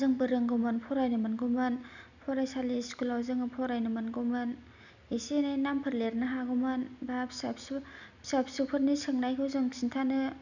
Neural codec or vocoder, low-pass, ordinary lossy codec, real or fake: none; 7.2 kHz; none; real